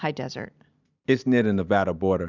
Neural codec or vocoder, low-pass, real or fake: none; 7.2 kHz; real